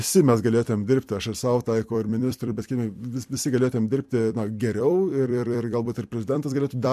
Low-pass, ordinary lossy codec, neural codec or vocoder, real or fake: 14.4 kHz; MP3, 64 kbps; vocoder, 44.1 kHz, 128 mel bands every 256 samples, BigVGAN v2; fake